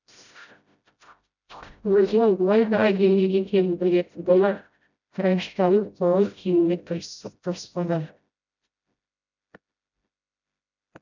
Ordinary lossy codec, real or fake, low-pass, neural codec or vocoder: none; fake; 7.2 kHz; codec, 16 kHz, 0.5 kbps, FreqCodec, smaller model